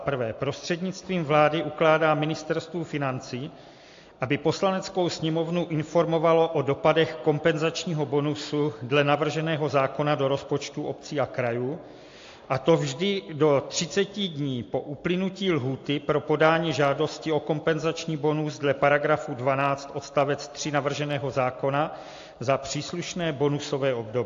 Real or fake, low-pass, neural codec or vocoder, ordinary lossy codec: real; 7.2 kHz; none; AAC, 48 kbps